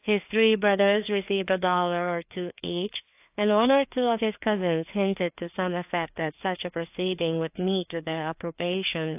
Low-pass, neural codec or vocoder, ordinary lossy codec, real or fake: 3.6 kHz; codec, 16 kHz, 2 kbps, FreqCodec, larger model; AAC, 32 kbps; fake